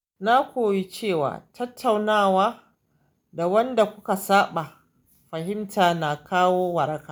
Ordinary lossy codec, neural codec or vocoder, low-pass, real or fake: none; none; none; real